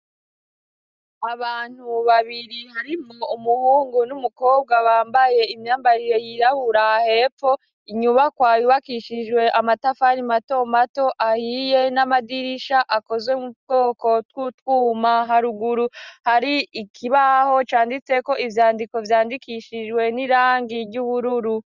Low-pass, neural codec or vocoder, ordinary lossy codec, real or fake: 7.2 kHz; none; Opus, 64 kbps; real